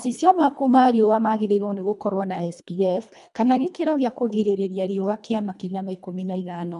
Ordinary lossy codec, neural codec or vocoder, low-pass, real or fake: none; codec, 24 kHz, 1.5 kbps, HILCodec; 10.8 kHz; fake